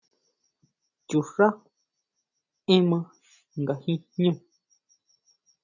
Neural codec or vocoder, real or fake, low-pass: none; real; 7.2 kHz